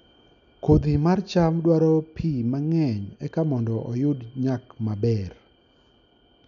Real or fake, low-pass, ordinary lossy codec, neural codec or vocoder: real; 7.2 kHz; none; none